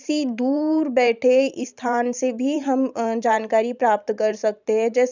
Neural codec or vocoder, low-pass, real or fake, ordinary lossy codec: vocoder, 44.1 kHz, 128 mel bands every 512 samples, BigVGAN v2; 7.2 kHz; fake; none